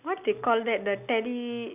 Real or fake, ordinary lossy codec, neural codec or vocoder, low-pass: real; none; none; 3.6 kHz